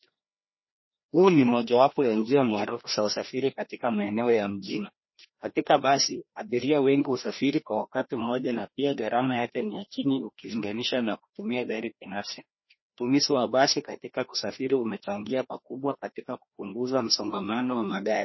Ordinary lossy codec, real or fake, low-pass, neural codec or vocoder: MP3, 24 kbps; fake; 7.2 kHz; codec, 16 kHz, 1 kbps, FreqCodec, larger model